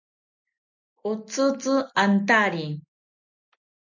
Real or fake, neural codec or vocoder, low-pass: real; none; 7.2 kHz